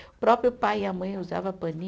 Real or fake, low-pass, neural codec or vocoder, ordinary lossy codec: real; none; none; none